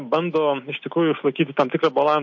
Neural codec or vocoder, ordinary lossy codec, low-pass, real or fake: none; MP3, 48 kbps; 7.2 kHz; real